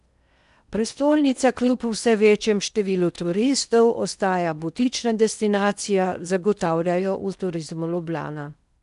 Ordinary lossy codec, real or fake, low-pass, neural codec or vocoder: none; fake; 10.8 kHz; codec, 16 kHz in and 24 kHz out, 0.8 kbps, FocalCodec, streaming, 65536 codes